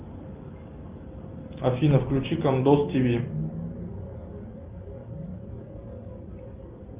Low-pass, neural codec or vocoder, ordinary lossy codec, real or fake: 3.6 kHz; none; Opus, 24 kbps; real